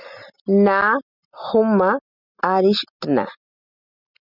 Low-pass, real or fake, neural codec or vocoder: 5.4 kHz; real; none